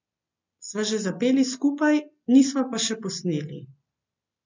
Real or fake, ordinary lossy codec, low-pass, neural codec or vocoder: real; MP3, 64 kbps; 7.2 kHz; none